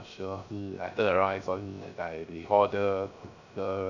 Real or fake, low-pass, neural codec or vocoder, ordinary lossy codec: fake; 7.2 kHz; codec, 16 kHz, 0.3 kbps, FocalCodec; none